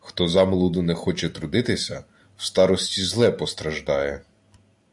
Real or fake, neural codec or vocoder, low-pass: real; none; 10.8 kHz